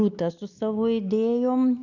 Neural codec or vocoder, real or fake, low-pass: none; real; 7.2 kHz